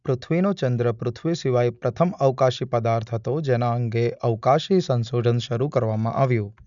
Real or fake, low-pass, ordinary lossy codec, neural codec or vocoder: real; 7.2 kHz; none; none